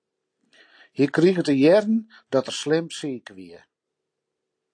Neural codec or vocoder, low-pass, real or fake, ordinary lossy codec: none; 9.9 kHz; real; AAC, 48 kbps